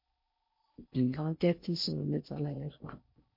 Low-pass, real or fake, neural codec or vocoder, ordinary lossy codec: 5.4 kHz; fake; codec, 16 kHz in and 24 kHz out, 0.6 kbps, FocalCodec, streaming, 4096 codes; MP3, 32 kbps